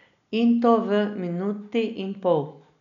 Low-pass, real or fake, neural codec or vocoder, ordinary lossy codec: 7.2 kHz; real; none; none